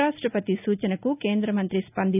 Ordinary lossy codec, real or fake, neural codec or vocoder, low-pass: none; real; none; 3.6 kHz